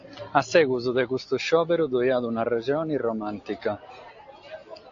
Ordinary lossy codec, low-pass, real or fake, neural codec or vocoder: AAC, 48 kbps; 7.2 kHz; real; none